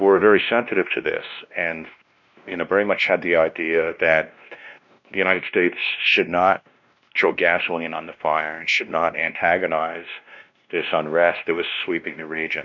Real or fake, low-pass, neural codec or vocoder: fake; 7.2 kHz; codec, 16 kHz, 1 kbps, X-Codec, WavLM features, trained on Multilingual LibriSpeech